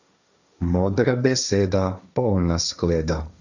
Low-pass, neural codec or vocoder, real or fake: 7.2 kHz; codec, 16 kHz, 1.1 kbps, Voila-Tokenizer; fake